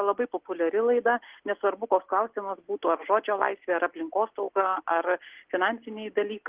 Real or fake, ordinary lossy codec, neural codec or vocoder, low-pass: real; Opus, 16 kbps; none; 3.6 kHz